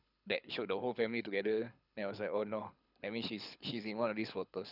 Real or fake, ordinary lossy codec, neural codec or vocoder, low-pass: fake; none; codec, 24 kHz, 6 kbps, HILCodec; 5.4 kHz